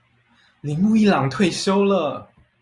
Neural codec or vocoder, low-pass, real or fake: none; 10.8 kHz; real